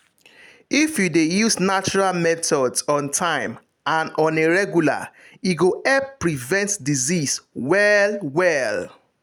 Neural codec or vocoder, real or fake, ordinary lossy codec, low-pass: none; real; none; 19.8 kHz